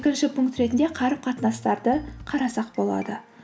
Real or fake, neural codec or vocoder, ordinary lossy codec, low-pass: real; none; none; none